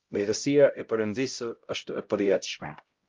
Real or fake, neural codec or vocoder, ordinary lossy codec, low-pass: fake; codec, 16 kHz, 0.5 kbps, X-Codec, HuBERT features, trained on LibriSpeech; Opus, 32 kbps; 7.2 kHz